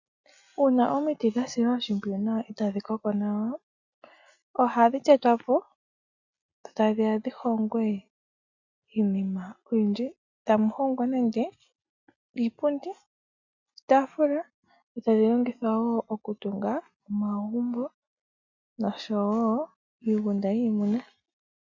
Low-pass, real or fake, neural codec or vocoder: 7.2 kHz; real; none